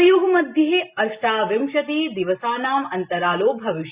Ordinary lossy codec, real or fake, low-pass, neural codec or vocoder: Opus, 64 kbps; real; 3.6 kHz; none